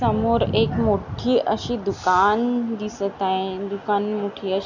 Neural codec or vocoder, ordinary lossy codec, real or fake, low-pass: none; none; real; 7.2 kHz